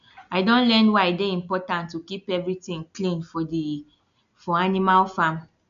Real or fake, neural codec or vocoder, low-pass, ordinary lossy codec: real; none; 7.2 kHz; none